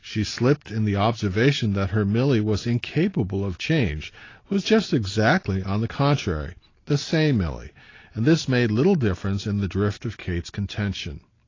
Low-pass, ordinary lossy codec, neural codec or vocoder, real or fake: 7.2 kHz; AAC, 32 kbps; none; real